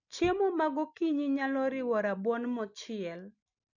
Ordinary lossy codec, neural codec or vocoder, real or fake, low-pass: none; none; real; 7.2 kHz